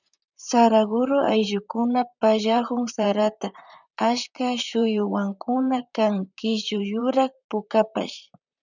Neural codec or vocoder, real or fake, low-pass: vocoder, 44.1 kHz, 128 mel bands, Pupu-Vocoder; fake; 7.2 kHz